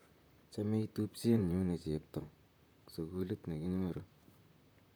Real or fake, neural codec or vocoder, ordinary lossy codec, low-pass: fake; vocoder, 44.1 kHz, 128 mel bands, Pupu-Vocoder; none; none